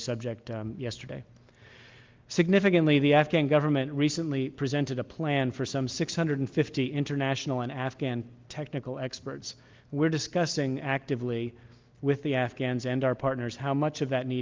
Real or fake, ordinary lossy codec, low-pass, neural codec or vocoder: real; Opus, 32 kbps; 7.2 kHz; none